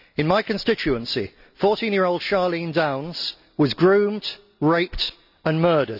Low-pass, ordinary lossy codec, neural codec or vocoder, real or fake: 5.4 kHz; none; none; real